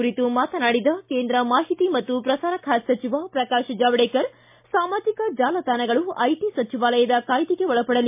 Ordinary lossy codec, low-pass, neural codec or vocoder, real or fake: MP3, 32 kbps; 3.6 kHz; none; real